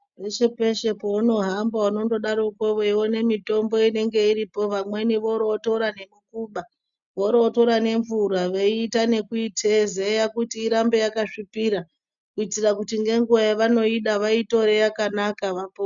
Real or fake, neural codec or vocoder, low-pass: real; none; 7.2 kHz